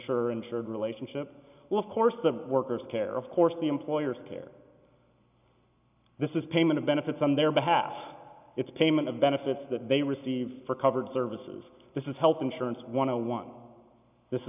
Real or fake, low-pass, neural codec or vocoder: real; 3.6 kHz; none